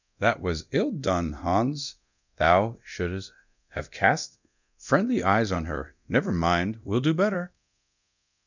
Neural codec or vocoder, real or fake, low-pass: codec, 24 kHz, 0.9 kbps, DualCodec; fake; 7.2 kHz